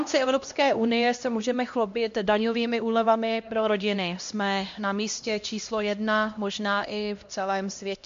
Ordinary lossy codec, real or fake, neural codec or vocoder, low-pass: MP3, 48 kbps; fake; codec, 16 kHz, 1 kbps, X-Codec, HuBERT features, trained on LibriSpeech; 7.2 kHz